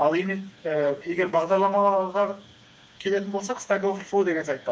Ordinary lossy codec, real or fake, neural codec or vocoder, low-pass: none; fake; codec, 16 kHz, 2 kbps, FreqCodec, smaller model; none